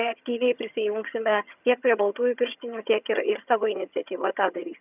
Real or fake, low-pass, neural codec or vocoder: fake; 3.6 kHz; vocoder, 22.05 kHz, 80 mel bands, HiFi-GAN